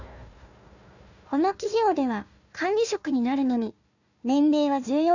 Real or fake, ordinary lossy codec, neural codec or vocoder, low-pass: fake; none; codec, 16 kHz, 1 kbps, FunCodec, trained on Chinese and English, 50 frames a second; 7.2 kHz